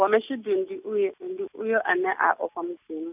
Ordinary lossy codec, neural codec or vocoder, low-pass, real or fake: none; none; 3.6 kHz; real